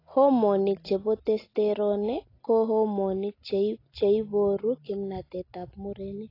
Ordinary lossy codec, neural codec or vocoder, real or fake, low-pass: AAC, 24 kbps; none; real; 5.4 kHz